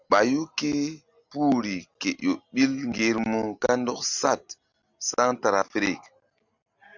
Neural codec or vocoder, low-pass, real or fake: none; 7.2 kHz; real